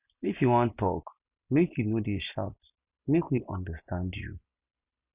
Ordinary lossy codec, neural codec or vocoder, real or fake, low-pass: Opus, 64 kbps; none; real; 3.6 kHz